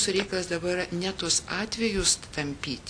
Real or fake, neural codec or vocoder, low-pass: real; none; 9.9 kHz